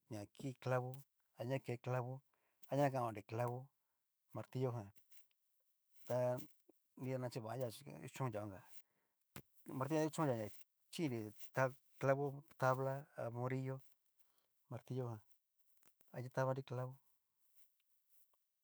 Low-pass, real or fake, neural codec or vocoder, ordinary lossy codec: none; fake; autoencoder, 48 kHz, 128 numbers a frame, DAC-VAE, trained on Japanese speech; none